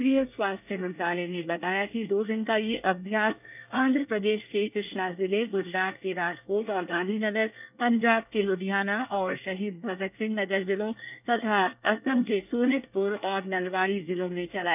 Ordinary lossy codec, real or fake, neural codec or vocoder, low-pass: none; fake; codec, 24 kHz, 1 kbps, SNAC; 3.6 kHz